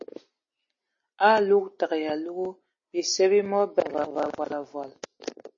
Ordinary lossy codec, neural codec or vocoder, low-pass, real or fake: MP3, 32 kbps; none; 7.2 kHz; real